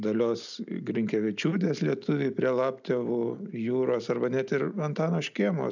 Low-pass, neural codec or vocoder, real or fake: 7.2 kHz; none; real